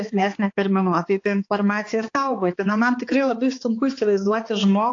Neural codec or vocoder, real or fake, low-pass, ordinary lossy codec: codec, 16 kHz, 2 kbps, X-Codec, HuBERT features, trained on balanced general audio; fake; 7.2 kHz; AAC, 48 kbps